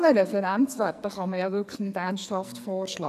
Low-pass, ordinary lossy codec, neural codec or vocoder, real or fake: 14.4 kHz; none; codec, 44.1 kHz, 2.6 kbps, SNAC; fake